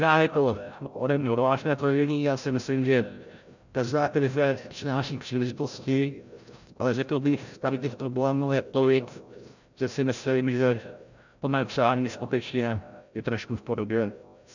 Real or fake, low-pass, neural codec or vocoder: fake; 7.2 kHz; codec, 16 kHz, 0.5 kbps, FreqCodec, larger model